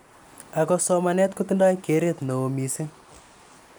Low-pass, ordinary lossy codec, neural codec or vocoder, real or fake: none; none; none; real